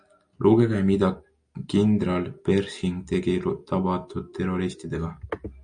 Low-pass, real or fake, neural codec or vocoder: 9.9 kHz; real; none